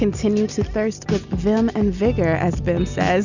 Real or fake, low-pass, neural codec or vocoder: real; 7.2 kHz; none